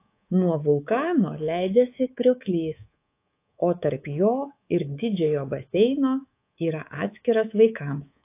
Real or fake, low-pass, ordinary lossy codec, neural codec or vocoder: fake; 3.6 kHz; AAC, 24 kbps; codec, 24 kHz, 3.1 kbps, DualCodec